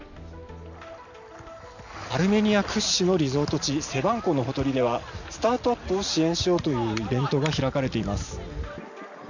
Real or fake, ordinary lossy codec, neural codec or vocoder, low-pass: real; none; none; 7.2 kHz